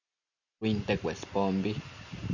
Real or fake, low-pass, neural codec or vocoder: real; 7.2 kHz; none